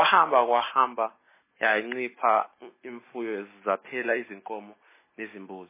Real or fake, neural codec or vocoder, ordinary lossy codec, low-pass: real; none; MP3, 16 kbps; 3.6 kHz